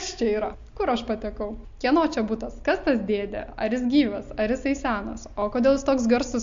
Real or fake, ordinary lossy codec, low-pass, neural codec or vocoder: real; MP3, 48 kbps; 7.2 kHz; none